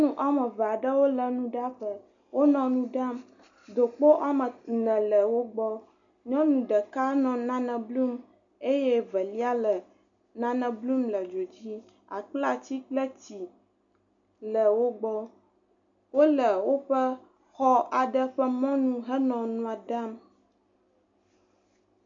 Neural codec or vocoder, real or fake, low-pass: none; real; 7.2 kHz